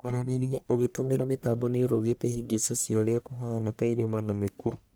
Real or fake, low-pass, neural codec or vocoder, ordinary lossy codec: fake; none; codec, 44.1 kHz, 1.7 kbps, Pupu-Codec; none